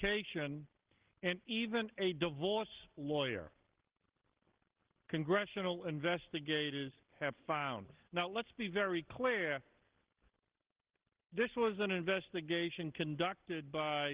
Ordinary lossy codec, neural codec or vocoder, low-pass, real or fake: Opus, 16 kbps; none; 3.6 kHz; real